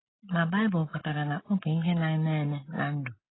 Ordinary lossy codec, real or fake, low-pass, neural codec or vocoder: AAC, 16 kbps; real; 7.2 kHz; none